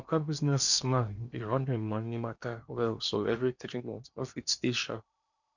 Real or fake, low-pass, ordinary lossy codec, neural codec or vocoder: fake; 7.2 kHz; none; codec, 16 kHz in and 24 kHz out, 0.8 kbps, FocalCodec, streaming, 65536 codes